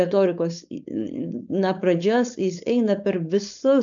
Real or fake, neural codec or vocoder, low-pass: fake; codec, 16 kHz, 4.8 kbps, FACodec; 7.2 kHz